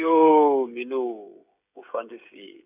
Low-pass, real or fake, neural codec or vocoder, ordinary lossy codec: 3.6 kHz; fake; codec, 16 kHz, 16 kbps, FreqCodec, smaller model; none